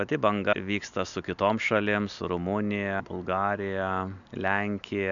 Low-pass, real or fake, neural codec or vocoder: 7.2 kHz; real; none